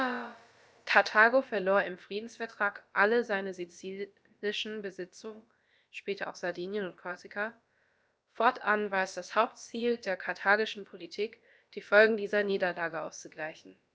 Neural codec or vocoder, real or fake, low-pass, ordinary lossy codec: codec, 16 kHz, about 1 kbps, DyCAST, with the encoder's durations; fake; none; none